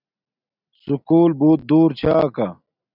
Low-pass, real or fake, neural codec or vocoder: 5.4 kHz; real; none